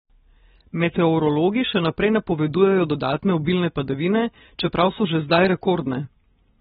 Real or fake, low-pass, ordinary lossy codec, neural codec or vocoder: real; 7.2 kHz; AAC, 16 kbps; none